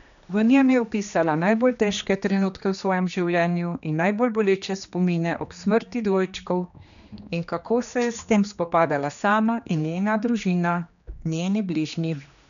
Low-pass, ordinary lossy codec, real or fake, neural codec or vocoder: 7.2 kHz; none; fake; codec, 16 kHz, 2 kbps, X-Codec, HuBERT features, trained on general audio